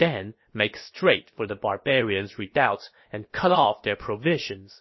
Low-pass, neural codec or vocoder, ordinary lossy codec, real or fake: 7.2 kHz; codec, 16 kHz, about 1 kbps, DyCAST, with the encoder's durations; MP3, 24 kbps; fake